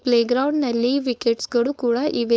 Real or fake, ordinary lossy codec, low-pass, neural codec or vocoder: fake; none; none; codec, 16 kHz, 4.8 kbps, FACodec